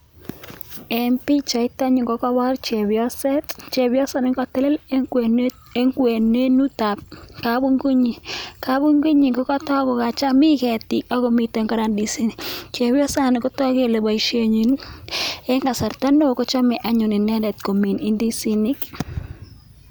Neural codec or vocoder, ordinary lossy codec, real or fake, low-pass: none; none; real; none